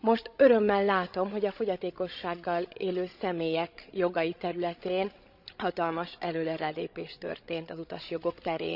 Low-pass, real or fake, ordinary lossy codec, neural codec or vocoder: 5.4 kHz; fake; none; codec, 16 kHz, 16 kbps, FreqCodec, larger model